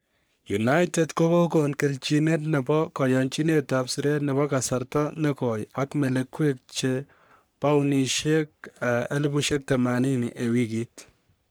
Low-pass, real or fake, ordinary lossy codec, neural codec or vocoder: none; fake; none; codec, 44.1 kHz, 3.4 kbps, Pupu-Codec